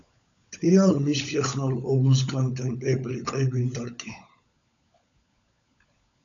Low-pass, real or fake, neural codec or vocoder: 7.2 kHz; fake; codec, 16 kHz, 16 kbps, FunCodec, trained on LibriTTS, 50 frames a second